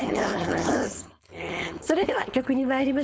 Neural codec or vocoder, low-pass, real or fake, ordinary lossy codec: codec, 16 kHz, 4.8 kbps, FACodec; none; fake; none